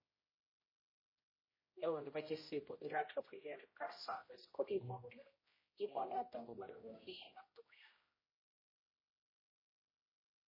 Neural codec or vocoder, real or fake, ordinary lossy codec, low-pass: codec, 16 kHz, 0.5 kbps, X-Codec, HuBERT features, trained on general audio; fake; MP3, 24 kbps; 5.4 kHz